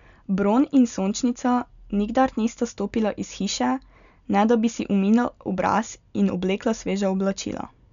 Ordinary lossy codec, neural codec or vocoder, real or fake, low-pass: none; none; real; 7.2 kHz